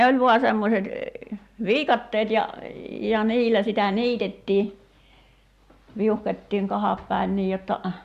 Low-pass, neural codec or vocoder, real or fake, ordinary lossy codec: 14.4 kHz; none; real; Opus, 64 kbps